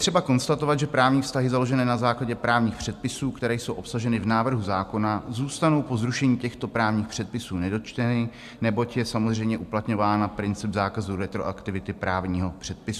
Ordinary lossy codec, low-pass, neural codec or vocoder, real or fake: MP3, 96 kbps; 14.4 kHz; none; real